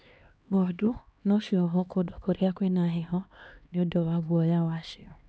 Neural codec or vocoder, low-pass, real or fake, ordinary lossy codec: codec, 16 kHz, 2 kbps, X-Codec, HuBERT features, trained on LibriSpeech; none; fake; none